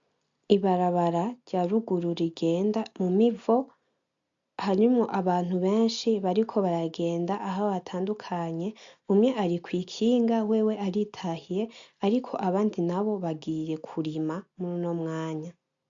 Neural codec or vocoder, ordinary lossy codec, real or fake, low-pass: none; MP3, 64 kbps; real; 7.2 kHz